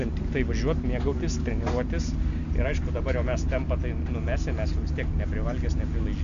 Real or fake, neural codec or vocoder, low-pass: real; none; 7.2 kHz